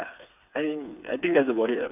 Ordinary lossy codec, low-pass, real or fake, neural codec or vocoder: none; 3.6 kHz; fake; codec, 16 kHz, 4 kbps, FreqCodec, smaller model